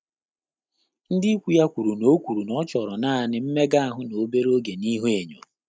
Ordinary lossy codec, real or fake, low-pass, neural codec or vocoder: none; real; none; none